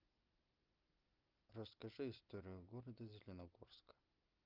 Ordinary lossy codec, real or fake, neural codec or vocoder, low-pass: Opus, 24 kbps; real; none; 5.4 kHz